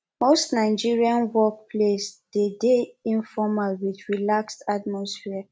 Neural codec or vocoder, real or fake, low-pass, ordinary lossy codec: none; real; none; none